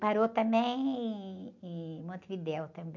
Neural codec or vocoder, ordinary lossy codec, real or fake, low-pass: none; none; real; 7.2 kHz